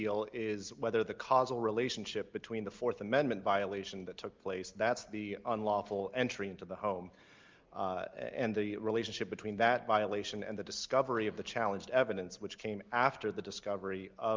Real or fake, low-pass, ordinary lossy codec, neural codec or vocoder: real; 7.2 kHz; Opus, 24 kbps; none